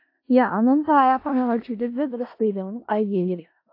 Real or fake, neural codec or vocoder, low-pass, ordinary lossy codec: fake; codec, 16 kHz in and 24 kHz out, 0.4 kbps, LongCat-Audio-Codec, four codebook decoder; 5.4 kHz; AAC, 32 kbps